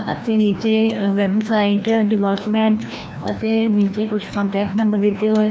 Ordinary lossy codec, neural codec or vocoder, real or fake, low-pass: none; codec, 16 kHz, 1 kbps, FreqCodec, larger model; fake; none